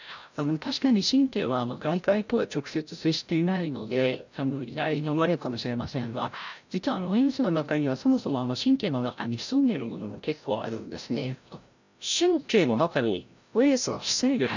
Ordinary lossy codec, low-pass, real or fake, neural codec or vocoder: none; 7.2 kHz; fake; codec, 16 kHz, 0.5 kbps, FreqCodec, larger model